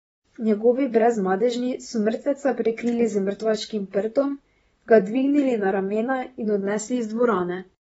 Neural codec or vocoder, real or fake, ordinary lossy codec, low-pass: vocoder, 44.1 kHz, 128 mel bands, Pupu-Vocoder; fake; AAC, 24 kbps; 19.8 kHz